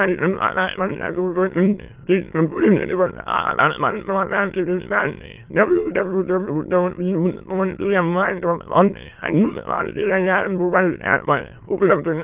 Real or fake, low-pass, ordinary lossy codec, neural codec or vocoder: fake; 3.6 kHz; Opus, 32 kbps; autoencoder, 22.05 kHz, a latent of 192 numbers a frame, VITS, trained on many speakers